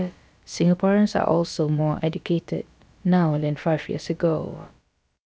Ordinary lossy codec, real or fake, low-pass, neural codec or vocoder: none; fake; none; codec, 16 kHz, about 1 kbps, DyCAST, with the encoder's durations